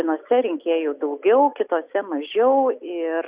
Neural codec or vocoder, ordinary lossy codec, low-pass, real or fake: none; Opus, 64 kbps; 3.6 kHz; real